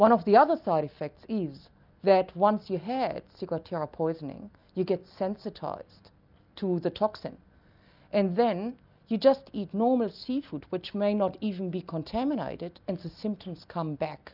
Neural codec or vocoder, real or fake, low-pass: none; real; 5.4 kHz